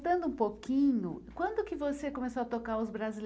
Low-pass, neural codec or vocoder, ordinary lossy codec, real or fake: none; none; none; real